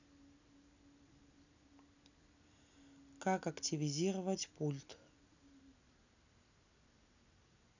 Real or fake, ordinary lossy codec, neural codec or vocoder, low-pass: real; none; none; 7.2 kHz